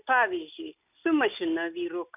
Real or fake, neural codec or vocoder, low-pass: real; none; 3.6 kHz